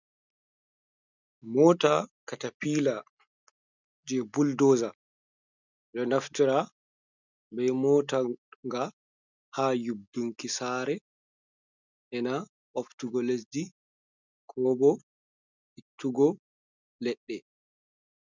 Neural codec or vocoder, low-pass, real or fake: none; 7.2 kHz; real